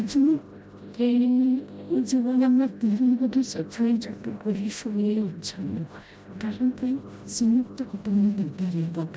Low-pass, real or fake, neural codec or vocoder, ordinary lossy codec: none; fake; codec, 16 kHz, 0.5 kbps, FreqCodec, smaller model; none